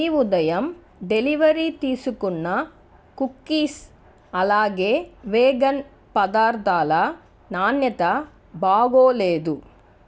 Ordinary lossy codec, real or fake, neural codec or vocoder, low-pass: none; real; none; none